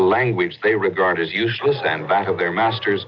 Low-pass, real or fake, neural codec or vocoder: 7.2 kHz; real; none